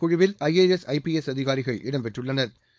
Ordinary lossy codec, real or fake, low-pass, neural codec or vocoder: none; fake; none; codec, 16 kHz, 4.8 kbps, FACodec